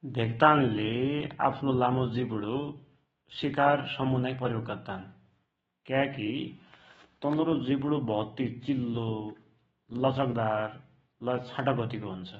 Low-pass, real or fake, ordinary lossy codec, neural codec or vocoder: 19.8 kHz; fake; AAC, 24 kbps; autoencoder, 48 kHz, 128 numbers a frame, DAC-VAE, trained on Japanese speech